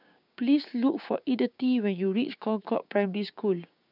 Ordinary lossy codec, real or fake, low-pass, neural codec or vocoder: none; fake; 5.4 kHz; codec, 16 kHz, 6 kbps, DAC